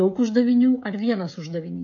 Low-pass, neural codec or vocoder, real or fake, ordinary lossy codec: 7.2 kHz; codec, 16 kHz, 16 kbps, FreqCodec, smaller model; fake; AAC, 64 kbps